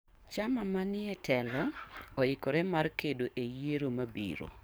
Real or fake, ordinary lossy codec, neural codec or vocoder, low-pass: fake; none; codec, 44.1 kHz, 7.8 kbps, DAC; none